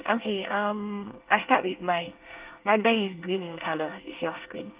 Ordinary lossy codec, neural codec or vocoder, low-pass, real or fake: Opus, 24 kbps; codec, 24 kHz, 1 kbps, SNAC; 3.6 kHz; fake